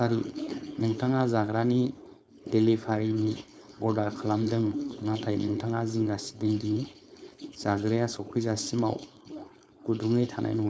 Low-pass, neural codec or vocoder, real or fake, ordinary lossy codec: none; codec, 16 kHz, 4.8 kbps, FACodec; fake; none